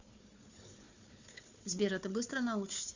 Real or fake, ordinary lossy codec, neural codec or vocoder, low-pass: fake; Opus, 64 kbps; codec, 16 kHz, 4 kbps, FunCodec, trained on Chinese and English, 50 frames a second; 7.2 kHz